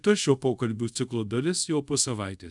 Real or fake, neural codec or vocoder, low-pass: fake; codec, 24 kHz, 0.5 kbps, DualCodec; 10.8 kHz